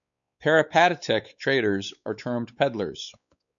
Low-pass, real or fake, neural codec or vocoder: 7.2 kHz; fake; codec, 16 kHz, 4 kbps, X-Codec, WavLM features, trained on Multilingual LibriSpeech